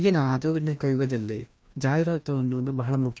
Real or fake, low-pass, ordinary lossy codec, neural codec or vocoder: fake; none; none; codec, 16 kHz, 1 kbps, FreqCodec, larger model